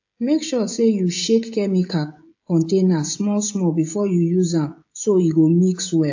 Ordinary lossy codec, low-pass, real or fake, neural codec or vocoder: AAC, 48 kbps; 7.2 kHz; fake; codec, 16 kHz, 16 kbps, FreqCodec, smaller model